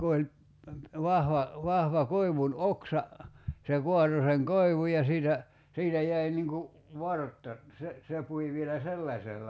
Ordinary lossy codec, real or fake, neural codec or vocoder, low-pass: none; real; none; none